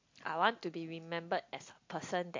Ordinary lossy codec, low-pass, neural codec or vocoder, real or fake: MP3, 48 kbps; 7.2 kHz; none; real